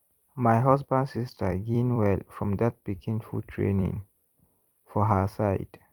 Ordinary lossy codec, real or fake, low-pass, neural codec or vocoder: Opus, 32 kbps; fake; 19.8 kHz; vocoder, 44.1 kHz, 128 mel bands every 256 samples, BigVGAN v2